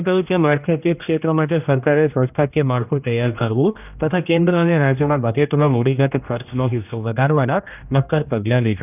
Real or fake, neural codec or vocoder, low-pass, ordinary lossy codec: fake; codec, 16 kHz, 1 kbps, X-Codec, HuBERT features, trained on general audio; 3.6 kHz; none